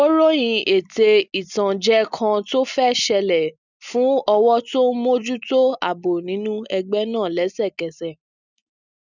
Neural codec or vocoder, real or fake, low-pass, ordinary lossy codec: none; real; 7.2 kHz; none